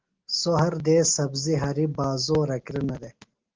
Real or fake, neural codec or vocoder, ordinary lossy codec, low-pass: real; none; Opus, 16 kbps; 7.2 kHz